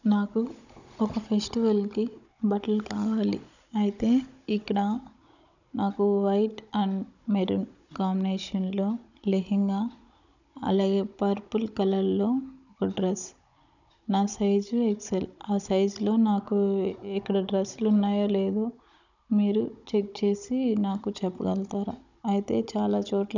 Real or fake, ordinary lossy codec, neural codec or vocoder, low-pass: fake; none; codec, 16 kHz, 16 kbps, FunCodec, trained on Chinese and English, 50 frames a second; 7.2 kHz